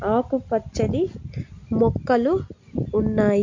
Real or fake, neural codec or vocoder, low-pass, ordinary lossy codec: real; none; 7.2 kHz; MP3, 48 kbps